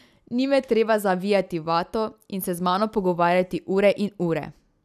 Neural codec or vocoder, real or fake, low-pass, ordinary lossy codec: none; real; 14.4 kHz; none